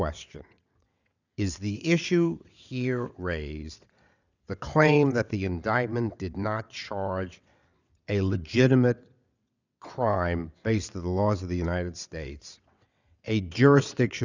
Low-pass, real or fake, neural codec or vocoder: 7.2 kHz; fake; vocoder, 22.05 kHz, 80 mel bands, WaveNeXt